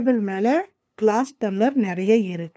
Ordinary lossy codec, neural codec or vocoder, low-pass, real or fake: none; codec, 16 kHz, 2 kbps, FunCodec, trained on LibriTTS, 25 frames a second; none; fake